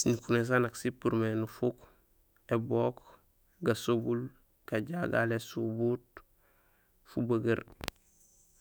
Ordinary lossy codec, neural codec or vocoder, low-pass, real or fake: none; autoencoder, 48 kHz, 128 numbers a frame, DAC-VAE, trained on Japanese speech; none; fake